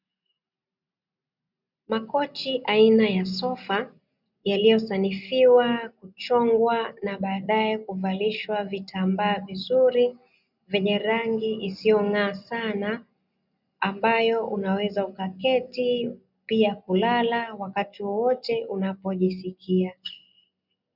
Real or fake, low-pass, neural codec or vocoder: real; 5.4 kHz; none